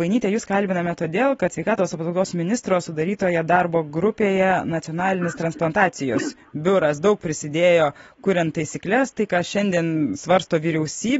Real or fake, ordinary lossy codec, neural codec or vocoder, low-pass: real; AAC, 24 kbps; none; 19.8 kHz